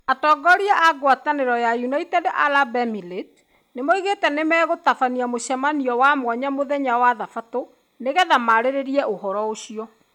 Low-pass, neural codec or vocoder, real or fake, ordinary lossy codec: 19.8 kHz; none; real; none